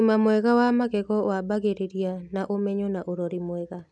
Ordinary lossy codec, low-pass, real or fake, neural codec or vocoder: none; none; real; none